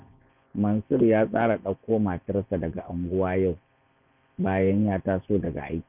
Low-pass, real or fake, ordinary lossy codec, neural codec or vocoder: 3.6 kHz; fake; none; vocoder, 24 kHz, 100 mel bands, Vocos